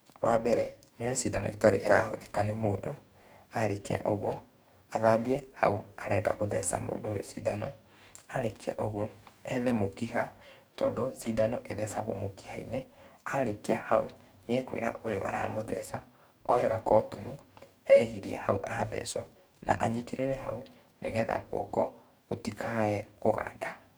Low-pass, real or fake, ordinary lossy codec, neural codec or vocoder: none; fake; none; codec, 44.1 kHz, 2.6 kbps, DAC